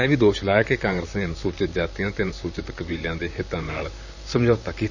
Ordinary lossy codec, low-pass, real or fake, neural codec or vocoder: none; 7.2 kHz; fake; vocoder, 44.1 kHz, 128 mel bands, Pupu-Vocoder